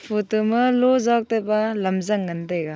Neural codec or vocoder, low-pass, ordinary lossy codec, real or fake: none; none; none; real